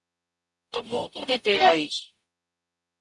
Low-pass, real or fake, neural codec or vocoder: 10.8 kHz; fake; codec, 44.1 kHz, 0.9 kbps, DAC